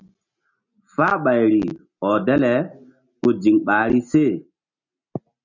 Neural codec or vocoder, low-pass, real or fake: none; 7.2 kHz; real